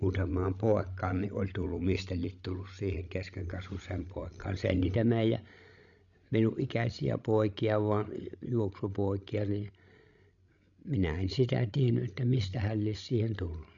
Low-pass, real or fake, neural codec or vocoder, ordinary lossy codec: 7.2 kHz; fake; codec, 16 kHz, 16 kbps, FreqCodec, larger model; none